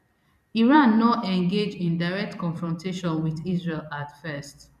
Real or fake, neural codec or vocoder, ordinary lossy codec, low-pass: fake; vocoder, 44.1 kHz, 128 mel bands every 256 samples, BigVGAN v2; none; 14.4 kHz